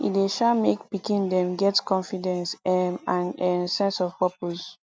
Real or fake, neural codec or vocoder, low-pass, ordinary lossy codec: real; none; none; none